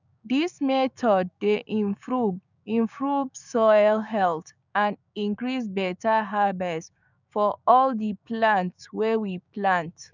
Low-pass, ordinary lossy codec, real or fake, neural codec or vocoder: 7.2 kHz; none; fake; codec, 16 kHz, 6 kbps, DAC